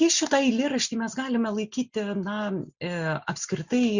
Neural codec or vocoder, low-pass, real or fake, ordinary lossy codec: none; 7.2 kHz; real; Opus, 64 kbps